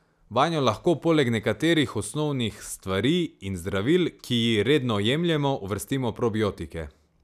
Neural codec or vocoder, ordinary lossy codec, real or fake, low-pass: none; none; real; 14.4 kHz